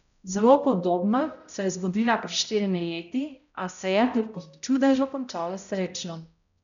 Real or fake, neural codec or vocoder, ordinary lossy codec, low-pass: fake; codec, 16 kHz, 0.5 kbps, X-Codec, HuBERT features, trained on balanced general audio; none; 7.2 kHz